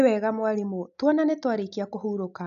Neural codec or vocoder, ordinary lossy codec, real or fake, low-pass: none; MP3, 96 kbps; real; 7.2 kHz